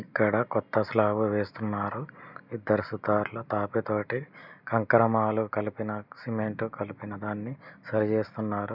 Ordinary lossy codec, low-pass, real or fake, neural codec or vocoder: none; 5.4 kHz; real; none